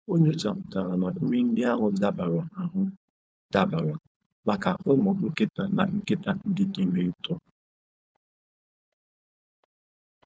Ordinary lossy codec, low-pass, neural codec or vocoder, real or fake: none; none; codec, 16 kHz, 4.8 kbps, FACodec; fake